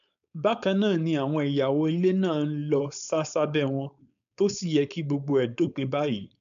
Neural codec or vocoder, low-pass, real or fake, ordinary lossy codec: codec, 16 kHz, 4.8 kbps, FACodec; 7.2 kHz; fake; none